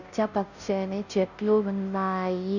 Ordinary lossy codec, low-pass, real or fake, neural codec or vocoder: none; 7.2 kHz; fake; codec, 16 kHz, 0.5 kbps, FunCodec, trained on Chinese and English, 25 frames a second